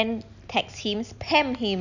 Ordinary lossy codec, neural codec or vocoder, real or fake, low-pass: none; none; real; 7.2 kHz